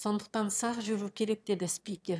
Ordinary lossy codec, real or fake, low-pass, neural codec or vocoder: none; fake; none; autoencoder, 22.05 kHz, a latent of 192 numbers a frame, VITS, trained on one speaker